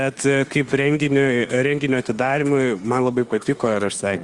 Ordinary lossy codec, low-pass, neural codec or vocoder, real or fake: Opus, 32 kbps; 10.8 kHz; autoencoder, 48 kHz, 32 numbers a frame, DAC-VAE, trained on Japanese speech; fake